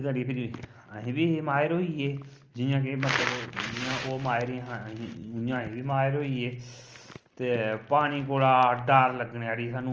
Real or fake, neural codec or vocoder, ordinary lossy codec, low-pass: real; none; Opus, 24 kbps; 7.2 kHz